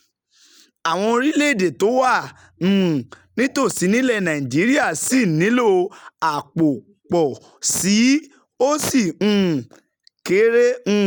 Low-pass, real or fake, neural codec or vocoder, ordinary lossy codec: none; real; none; none